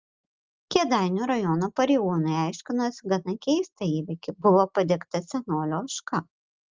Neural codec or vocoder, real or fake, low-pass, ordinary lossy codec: none; real; 7.2 kHz; Opus, 32 kbps